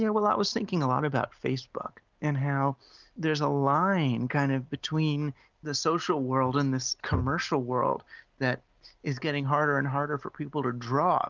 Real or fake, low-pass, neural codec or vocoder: real; 7.2 kHz; none